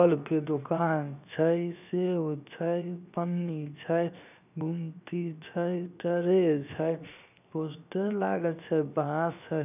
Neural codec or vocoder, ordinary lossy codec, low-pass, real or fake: codec, 16 kHz, 0.7 kbps, FocalCodec; none; 3.6 kHz; fake